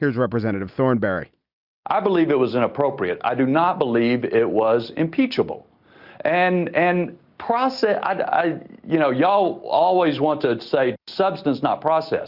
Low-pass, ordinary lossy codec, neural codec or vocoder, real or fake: 5.4 kHz; Opus, 64 kbps; none; real